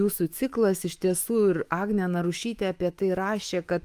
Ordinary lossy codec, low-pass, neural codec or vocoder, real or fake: Opus, 32 kbps; 14.4 kHz; autoencoder, 48 kHz, 128 numbers a frame, DAC-VAE, trained on Japanese speech; fake